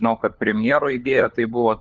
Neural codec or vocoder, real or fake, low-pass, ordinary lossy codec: codec, 16 kHz, 4 kbps, FunCodec, trained on LibriTTS, 50 frames a second; fake; 7.2 kHz; Opus, 32 kbps